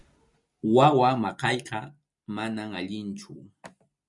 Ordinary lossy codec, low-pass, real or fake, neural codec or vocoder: MP3, 64 kbps; 10.8 kHz; fake; vocoder, 44.1 kHz, 128 mel bands every 256 samples, BigVGAN v2